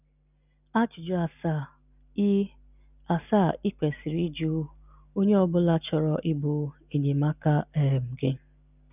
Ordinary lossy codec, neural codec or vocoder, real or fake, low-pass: none; none; real; 3.6 kHz